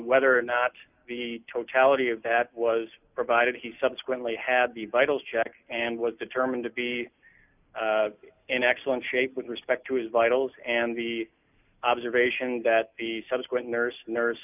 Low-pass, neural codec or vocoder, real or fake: 3.6 kHz; none; real